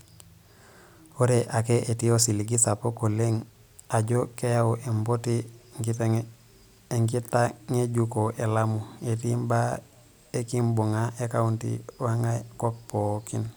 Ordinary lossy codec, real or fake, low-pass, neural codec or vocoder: none; real; none; none